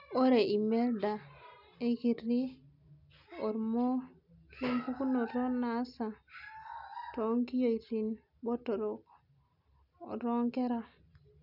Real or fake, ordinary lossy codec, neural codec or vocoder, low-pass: real; none; none; 5.4 kHz